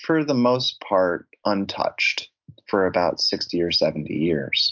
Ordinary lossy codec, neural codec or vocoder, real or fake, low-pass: MP3, 64 kbps; none; real; 7.2 kHz